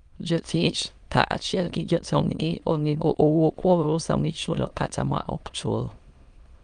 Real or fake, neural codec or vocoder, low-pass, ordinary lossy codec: fake; autoencoder, 22.05 kHz, a latent of 192 numbers a frame, VITS, trained on many speakers; 9.9 kHz; Opus, 32 kbps